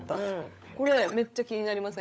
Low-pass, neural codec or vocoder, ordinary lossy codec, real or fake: none; codec, 16 kHz, 16 kbps, FunCodec, trained on LibriTTS, 50 frames a second; none; fake